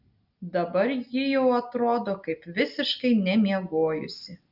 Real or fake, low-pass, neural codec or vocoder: real; 5.4 kHz; none